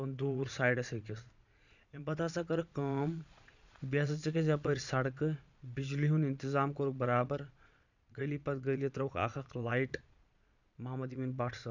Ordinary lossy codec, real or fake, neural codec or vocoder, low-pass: none; fake; vocoder, 22.05 kHz, 80 mel bands, Vocos; 7.2 kHz